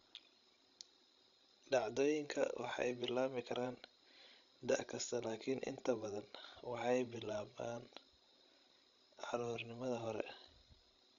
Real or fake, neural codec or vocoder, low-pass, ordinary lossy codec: fake; codec, 16 kHz, 16 kbps, FreqCodec, larger model; 7.2 kHz; none